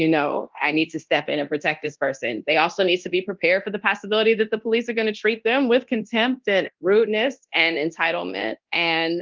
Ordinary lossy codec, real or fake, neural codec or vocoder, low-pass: Opus, 32 kbps; fake; codec, 24 kHz, 0.9 kbps, DualCodec; 7.2 kHz